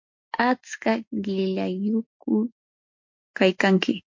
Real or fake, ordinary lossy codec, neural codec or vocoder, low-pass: real; MP3, 48 kbps; none; 7.2 kHz